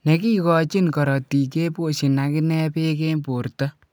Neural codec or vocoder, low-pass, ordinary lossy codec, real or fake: none; none; none; real